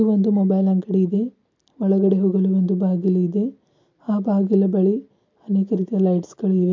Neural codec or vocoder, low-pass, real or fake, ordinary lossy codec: none; 7.2 kHz; real; MP3, 64 kbps